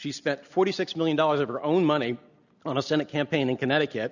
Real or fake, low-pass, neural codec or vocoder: real; 7.2 kHz; none